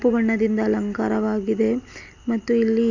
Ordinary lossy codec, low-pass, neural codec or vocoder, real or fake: none; 7.2 kHz; none; real